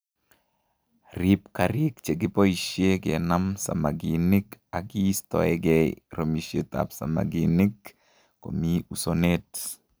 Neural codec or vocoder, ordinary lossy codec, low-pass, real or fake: none; none; none; real